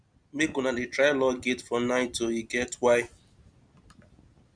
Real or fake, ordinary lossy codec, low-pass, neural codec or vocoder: real; none; 9.9 kHz; none